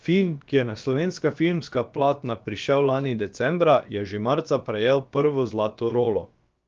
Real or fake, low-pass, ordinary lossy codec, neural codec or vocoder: fake; 7.2 kHz; Opus, 32 kbps; codec, 16 kHz, about 1 kbps, DyCAST, with the encoder's durations